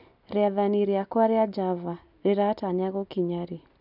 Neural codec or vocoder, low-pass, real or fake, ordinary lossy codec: none; 5.4 kHz; real; none